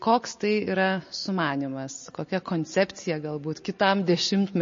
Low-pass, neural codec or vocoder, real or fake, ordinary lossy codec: 7.2 kHz; none; real; MP3, 32 kbps